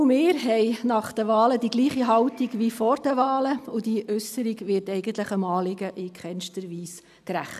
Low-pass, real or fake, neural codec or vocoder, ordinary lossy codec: 14.4 kHz; real; none; none